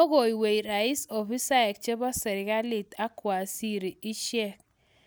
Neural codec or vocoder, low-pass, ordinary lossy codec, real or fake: none; none; none; real